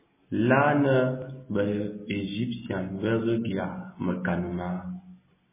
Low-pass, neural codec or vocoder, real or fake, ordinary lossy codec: 3.6 kHz; none; real; MP3, 16 kbps